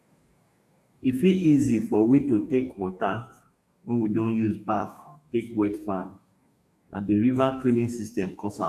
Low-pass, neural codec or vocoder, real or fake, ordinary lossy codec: 14.4 kHz; codec, 44.1 kHz, 2.6 kbps, DAC; fake; AAC, 96 kbps